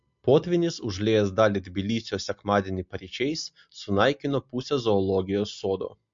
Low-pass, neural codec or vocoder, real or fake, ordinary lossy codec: 7.2 kHz; none; real; MP3, 48 kbps